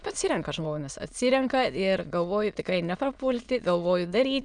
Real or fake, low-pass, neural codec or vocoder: fake; 9.9 kHz; autoencoder, 22.05 kHz, a latent of 192 numbers a frame, VITS, trained on many speakers